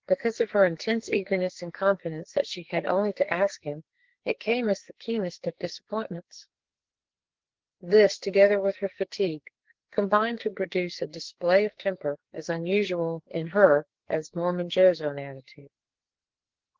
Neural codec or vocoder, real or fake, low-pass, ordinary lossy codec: codec, 44.1 kHz, 2.6 kbps, SNAC; fake; 7.2 kHz; Opus, 16 kbps